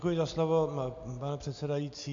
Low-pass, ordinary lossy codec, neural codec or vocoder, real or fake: 7.2 kHz; AAC, 48 kbps; none; real